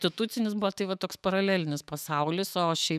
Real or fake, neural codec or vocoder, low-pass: fake; autoencoder, 48 kHz, 128 numbers a frame, DAC-VAE, trained on Japanese speech; 14.4 kHz